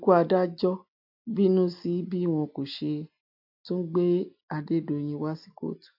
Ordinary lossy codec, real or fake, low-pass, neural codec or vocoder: AAC, 48 kbps; real; 5.4 kHz; none